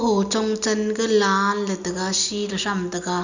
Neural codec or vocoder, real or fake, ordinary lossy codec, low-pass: none; real; none; 7.2 kHz